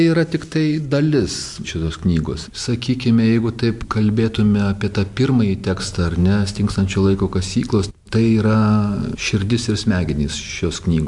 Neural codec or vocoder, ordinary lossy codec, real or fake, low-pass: none; MP3, 64 kbps; real; 10.8 kHz